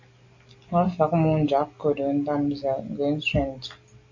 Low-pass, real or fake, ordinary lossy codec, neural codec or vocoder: 7.2 kHz; real; AAC, 48 kbps; none